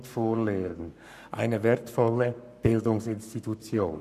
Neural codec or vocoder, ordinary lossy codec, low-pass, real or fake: codec, 44.1 kHz, 7.8 kbps, Pupu-Codec; none; 14.4 kHz; fake